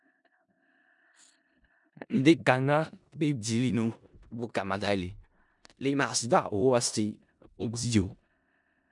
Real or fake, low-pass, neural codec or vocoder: fake; 10.8 kHz; codec, 16 kHz in and 24 kHz out, 0.4 kbps, LongCat-Audio-Codec, four codebook decoder